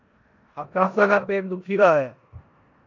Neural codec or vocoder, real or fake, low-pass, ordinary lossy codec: codec, 16 kHz in and 24 kHz out, 0.9 kbps, LongCat-Audio-Codec, four codebook decoder; fake; 7.2 kHz; MP3, 64 kbps